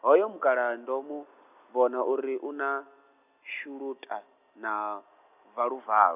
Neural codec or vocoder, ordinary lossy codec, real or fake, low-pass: none; none; real; 3.6 kHz